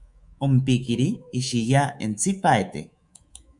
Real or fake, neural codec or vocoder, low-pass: fake; codec, 24 kHz, 3.1 kbps, DualCodec; 10.8 kHz